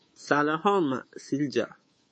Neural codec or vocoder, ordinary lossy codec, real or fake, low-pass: codec, 24 kHz, 3.1 kbps, DualCodec; MP3, 32 kbps; fake; 9.9 kHz